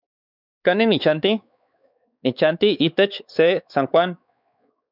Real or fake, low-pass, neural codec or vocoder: fake; 5.4 kHz; codec, 16 kHz, 4 kbps, X-Codec, WavLM features, trained on Multilingual LibriSpeech